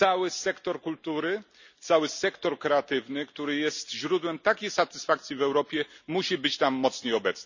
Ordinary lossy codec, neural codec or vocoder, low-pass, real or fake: none; none; 7.2 kHz; real